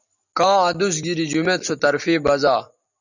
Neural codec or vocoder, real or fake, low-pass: none; real; 7.2 kHz